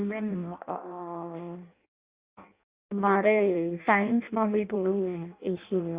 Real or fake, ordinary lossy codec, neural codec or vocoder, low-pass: fake; Opus, 64 kbps; codec, 16 kHz in and 24 kHz out, 0.6 kbps, FireRedTTS-2 codec; 3.6 kHz